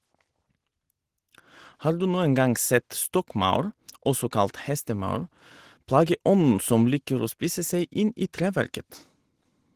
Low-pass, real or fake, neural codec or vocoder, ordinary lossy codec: 14.4 kHz; real; none; Opus, 16 kbps